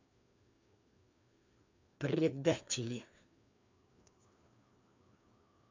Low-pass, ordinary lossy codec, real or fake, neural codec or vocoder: 7.2 kHz; AAC, 48 kbps; fake; codec, 16 kHz, 2 kbps, FreqCodec, larger model